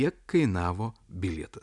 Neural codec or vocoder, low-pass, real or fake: none; 10.8 kHz; real